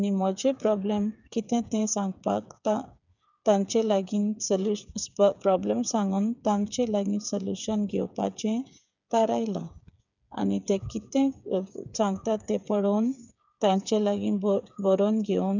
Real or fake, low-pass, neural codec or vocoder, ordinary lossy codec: fake; 7.2 kHz; codec, 16 kHz, 8 kbps, FreqCodec, smaller model; none